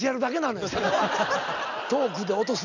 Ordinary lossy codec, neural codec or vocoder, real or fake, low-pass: none; none; real; 7.2 kHz